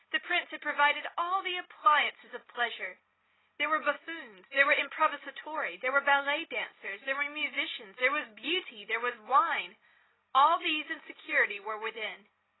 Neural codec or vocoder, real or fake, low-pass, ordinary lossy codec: none; real; 7.2 kHz; AAC, 16 kbps